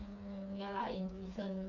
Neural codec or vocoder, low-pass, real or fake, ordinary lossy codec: codec, 16 kHz, 4 kbps, FreqCodec, smaller model; 7.2 kHz; fake; none